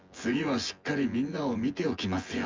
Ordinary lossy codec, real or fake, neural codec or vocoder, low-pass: Opus, 32 kbps; fake; vocoder, 24 kHz, 100 mel bands, Vocos; 7.2 kHz